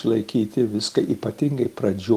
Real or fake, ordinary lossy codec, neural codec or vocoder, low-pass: real; Opus, 24 kbps; none; 14.4 kHz